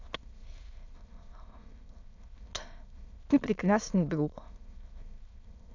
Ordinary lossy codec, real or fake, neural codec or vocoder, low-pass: none; fake; autoencoder, 22.05 kHz, a latent of 192 numbers a frame, VITS, trained on many speakers; 7.2 kHz